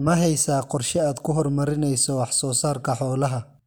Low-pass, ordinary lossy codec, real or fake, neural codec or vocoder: none; none; real; none